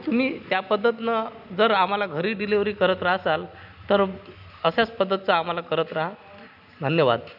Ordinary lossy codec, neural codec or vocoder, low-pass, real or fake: none; none; 5.4 kHz; real